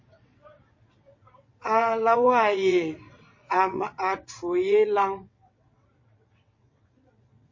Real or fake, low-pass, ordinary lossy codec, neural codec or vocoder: fake; 7.2 kHz; MP3, 32 kbps; vocoder, 22.05 kHz, 80 mel bands, WaveNeXt